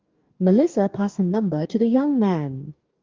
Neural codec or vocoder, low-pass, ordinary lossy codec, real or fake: codec, 44.1 kHz, 2.6 kbps, DAC; 7.2 kHz; Opus, 32 kbps; fake